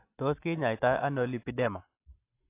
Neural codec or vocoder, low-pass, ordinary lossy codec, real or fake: none; 3.6 kHz; AAC, 24 kbps; real